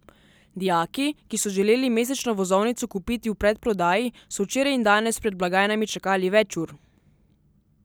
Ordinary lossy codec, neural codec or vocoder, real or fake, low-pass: none; none; real; none